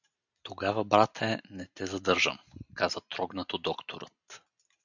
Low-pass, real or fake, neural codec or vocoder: 7.2 kHz; real; none